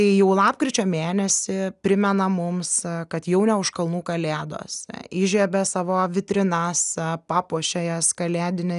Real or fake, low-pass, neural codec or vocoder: real; 10.8 kHz; none